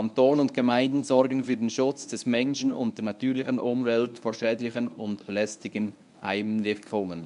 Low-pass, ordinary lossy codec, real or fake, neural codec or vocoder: 10.8 kHz; AAC, 96 kbps; fake; codec, 24 kHz, 0.9 kbps, WavTokenizer, medium speech release version 1